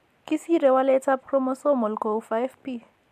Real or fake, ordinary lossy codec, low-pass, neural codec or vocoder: real; MP3, 64 kbps; 14.4 kHz; none